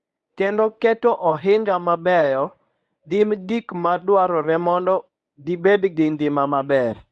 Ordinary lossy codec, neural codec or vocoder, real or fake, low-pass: none; codec, 24 kHz, 0.9 kbps, WavTokenizer, medium speech release version 1; fake; none